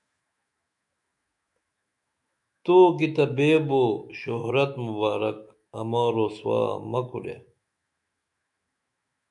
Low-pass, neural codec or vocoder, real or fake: 10.8 kHz; autoencoder, 48 kHz, 128 numbers a frame, DAC-VAE, trained on Japanese speech; fake